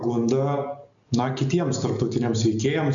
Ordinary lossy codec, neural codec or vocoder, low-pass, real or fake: MP3, 96 kbps; none; 7.2 kHz; real